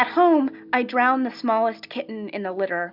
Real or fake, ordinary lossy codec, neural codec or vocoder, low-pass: real; Opus, 64 kbps; none; 5.4 kHz